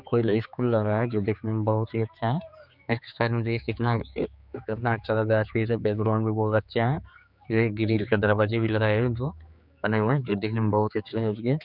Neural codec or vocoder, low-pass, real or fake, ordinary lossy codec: codec, 16 kHz, 4 kbps, X-Codec, HuBERT features, trained on general audio; 5.4 kHz; fake; none